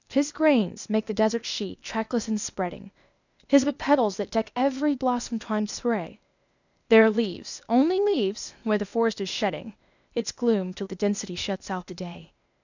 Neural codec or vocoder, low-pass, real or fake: codec, 16 kHz, 0.8 kbps, ZipCodec; 7.2 kHz; fake